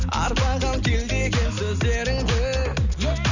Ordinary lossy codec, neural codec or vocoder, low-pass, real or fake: none; none; 7.2 kHz; real